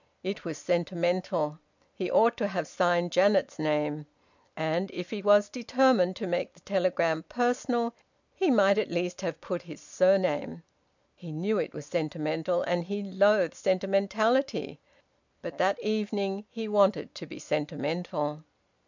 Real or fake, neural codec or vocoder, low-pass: real; none; 7.2 kHz